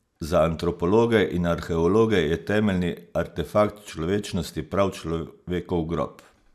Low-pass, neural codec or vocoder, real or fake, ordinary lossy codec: 14.4 kHz; none; real; MP3, 96 kbps